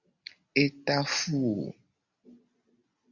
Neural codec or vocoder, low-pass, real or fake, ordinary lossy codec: none; 7.2 kHz; real; Opus, 64 kbps